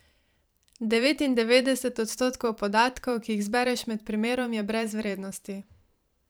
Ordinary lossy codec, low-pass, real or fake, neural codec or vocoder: none; none; fake; vocoder, 44.1 kHz, 128 mel bands every 512 samples, BigVGAN v2